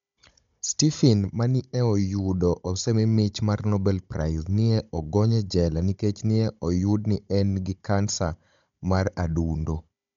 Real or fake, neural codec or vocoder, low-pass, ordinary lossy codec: fake; codec, 16 kHz, 16 kbps, FunCodec, trained on Chinese and English, 50 frames a second; 7.2 kHz; MP3, 64 kbps